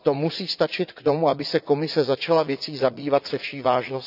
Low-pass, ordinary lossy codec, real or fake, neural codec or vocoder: 5.4 kHz; none; fake; vocoder, 44.1 kHz, 80 mel bands, Vocos